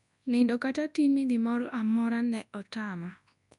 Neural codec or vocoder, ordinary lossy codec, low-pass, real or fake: codec, 24 kHz, 0.9 kbps, WavTokenizer, large speech release; none; 10.8 kHz; fake